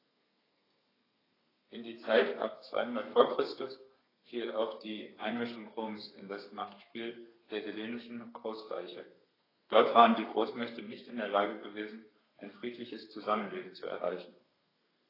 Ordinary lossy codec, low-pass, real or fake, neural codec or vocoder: AAC, 24 kbps; 5.4 kHz; fake; codec, 32 kHz, 1.9 kbps, SNAC